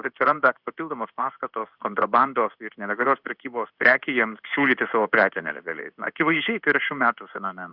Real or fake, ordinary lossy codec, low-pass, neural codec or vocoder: fake; Opus, 64 kbps; 5.4 kHz; codec, 16 kHz in and 24 kHz out, 1 kbps, XY-Tokenizer